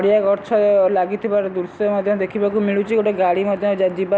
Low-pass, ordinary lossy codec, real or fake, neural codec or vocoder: none; none; real; none